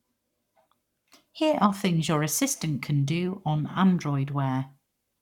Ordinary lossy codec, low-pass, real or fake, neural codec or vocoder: none; 19.8 kHz; fake; codec, 44.1 kHz, 7.8 kbps, Pupu-Codec